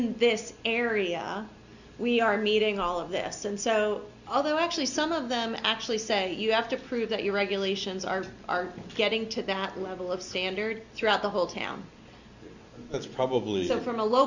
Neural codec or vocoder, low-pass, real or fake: none; 7.2 kHz; real